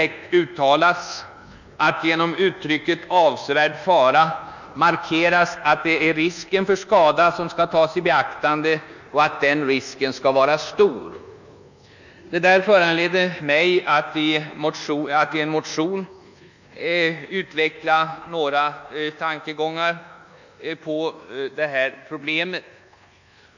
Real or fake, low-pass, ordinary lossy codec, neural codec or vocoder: fake; 7.2 kHz; none; codec, 24 kHz, 1.2 kbps, DualCodec